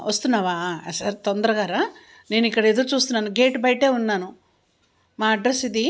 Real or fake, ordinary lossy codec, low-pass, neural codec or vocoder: real; none; none; none